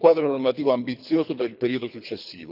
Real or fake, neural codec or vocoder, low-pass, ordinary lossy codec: fake; codec, 24 kHz, 3 kbps, HILCodec; 5.4 kHz; none